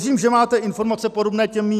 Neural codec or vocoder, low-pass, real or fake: none; 14.4 kHz; real